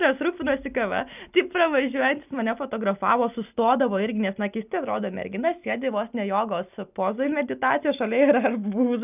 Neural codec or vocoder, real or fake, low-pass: none; real; 3.6 kHz